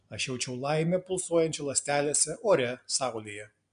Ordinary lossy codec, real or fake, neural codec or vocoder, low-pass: MP3, 64 kbps; real; none; 9.9 kHz